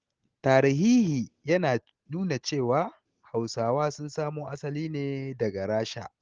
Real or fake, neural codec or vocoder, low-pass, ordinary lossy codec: real; none; 7.2 kHz; Opus, 16 kbps